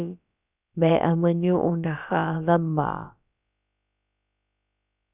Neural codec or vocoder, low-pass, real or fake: codec, 16 kHz, about 1 kbps, DyCAST, with the encoder's durations; 3.6 kHz; fake